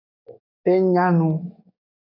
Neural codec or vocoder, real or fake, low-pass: codec, 44.1 kHz, 7.8 kbps, DAC; fake; 5.4 kHz